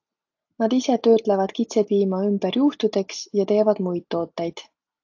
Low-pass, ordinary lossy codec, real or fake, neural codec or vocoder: 7.2 kHz; AAC, 48 kbps; real; none